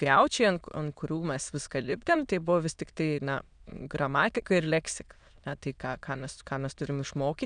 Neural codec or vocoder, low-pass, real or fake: autoencoder, 22.05 kHz, a latent of 192 numbers a frame, VITS, trained on many speakers; 9.9 kHz; fake